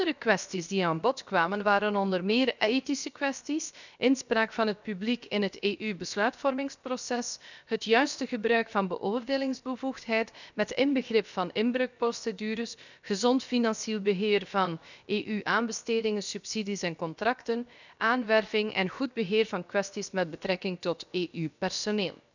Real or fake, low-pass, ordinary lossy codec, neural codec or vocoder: fake; 7.2 kHz; none; codec, 16 kHz, about 1 kbps, DyCAST, with the encoder's durations